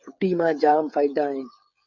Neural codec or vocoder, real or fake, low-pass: codec, 16 kHz, 8 kbps, FreqCodec, smaller model; fake; 7.2 kHz